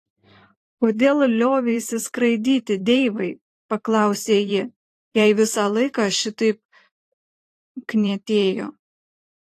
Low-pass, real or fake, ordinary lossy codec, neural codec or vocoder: 14.4 kHz; fake; AAC, 48 kbps; vocoder, 44.1 kHz, 128 mel bands every 512 samples, BigVGAN v2